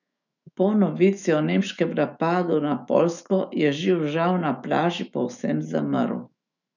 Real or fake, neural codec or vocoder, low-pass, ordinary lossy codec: fake; autoencoder, 48 kHz, 128 numbers a frame, DAC-VAE, trained on Japanese speech; 7.2 kHz; none